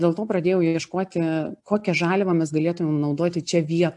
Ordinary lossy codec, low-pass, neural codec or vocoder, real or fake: AAC, 64 kbps; 10.8 kHz; none; real